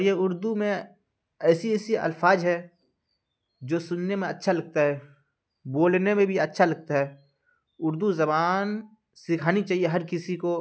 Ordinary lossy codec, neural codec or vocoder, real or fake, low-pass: none; none; real; none